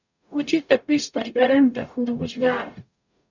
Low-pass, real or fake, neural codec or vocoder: 7.2 kHz; fake; codec, 44.1 kHz, 0.9 kbps, DAC